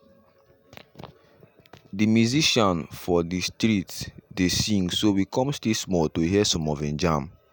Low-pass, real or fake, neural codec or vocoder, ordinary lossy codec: none; real; none; none